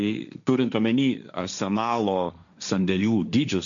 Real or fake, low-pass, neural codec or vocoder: fake; 7.2 kHz; codec, 16 kHz, 1.1 kbps, Voila-Tokenizer